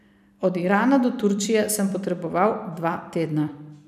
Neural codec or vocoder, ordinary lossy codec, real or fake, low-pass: vocoder, 44.1 kHz, 128 mel bands every 256 samples, BigVGAN v2; none; fake; 14.4 kHz